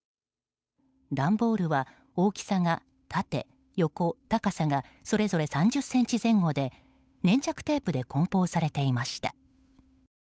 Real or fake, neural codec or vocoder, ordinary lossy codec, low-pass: fake; codec, 16 kHz, 8 kbps, FunCodec, trained on Chinese and English, 25 frames a second; none; none